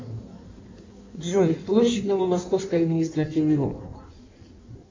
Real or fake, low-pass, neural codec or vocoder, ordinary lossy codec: fake; 7.2 kHz; codec, 16 kHz in and 24 kHz out, 1.1 kbps, FireRedTTS-2 codec; MP3, 64 kbps